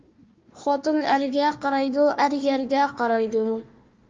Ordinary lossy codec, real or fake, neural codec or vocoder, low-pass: Opus, 24 kbps; fake; codec, 16 kHz, 1 kbps, FunCodec, trained on Chinese and English, 50 frames a second; 7.2 kHz